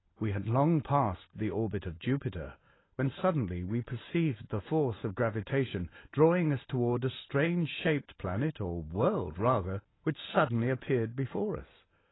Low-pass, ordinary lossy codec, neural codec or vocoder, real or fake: 7.2 kHz; AAC, 16 kbps; none; real